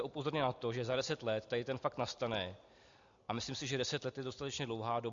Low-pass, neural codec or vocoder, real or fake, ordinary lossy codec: 7.2 kHz; none; real; MP3, 64 kbps